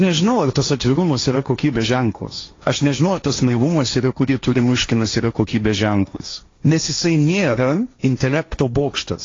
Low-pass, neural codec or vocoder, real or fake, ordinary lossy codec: 7.2 kHz; codec, 16 kHz, 1.1 kbps, Voila-Tokenizer; fake; AAC, 32 kbps